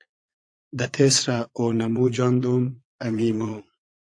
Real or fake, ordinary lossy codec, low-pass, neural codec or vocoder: fake; AAC, 64 kbps; 9.9 kHz; vocoder, 22.05 kHz, 80 mel bands, Vocos